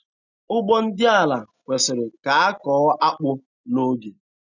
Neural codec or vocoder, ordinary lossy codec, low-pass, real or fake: none; none; 7.2 kHz; real